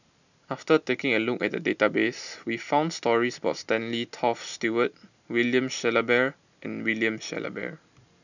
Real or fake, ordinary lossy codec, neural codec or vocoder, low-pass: real; none; none; 7.2 kHz